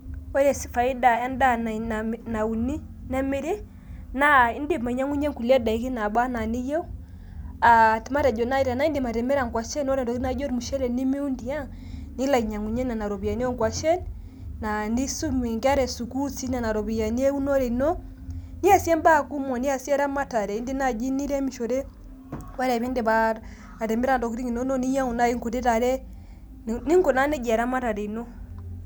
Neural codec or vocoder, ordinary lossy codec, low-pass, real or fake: none; none; none; real